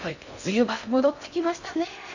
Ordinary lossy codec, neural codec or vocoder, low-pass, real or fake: none; codec, 16 kHz in and 24 kHz out, 0.6 kbps, FocalCodec, streaming, 2048 codes; 7.2 kHz; fake